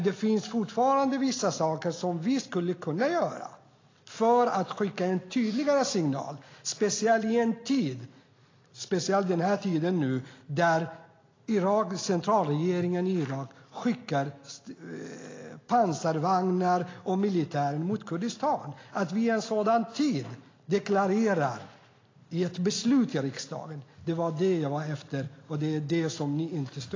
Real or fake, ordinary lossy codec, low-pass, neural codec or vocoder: real; AAC, 32 kbps; 7.2 kHz; none